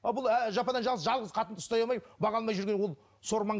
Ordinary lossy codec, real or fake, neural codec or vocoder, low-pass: none; real; none; none